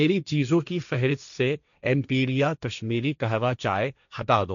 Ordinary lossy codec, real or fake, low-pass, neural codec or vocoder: none; fake; 7.2 kHz; codec, 16 kHz, 1.1 kbps, Voila-Tokenizer